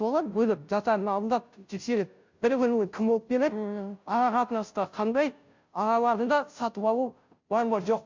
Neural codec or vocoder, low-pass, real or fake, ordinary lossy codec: codec, 16 kHz, 0.5 kbps, FunCodec, trained on Chinese and English, 25 frames a second; 7.2 kHz; fake; MP3, 64 kbps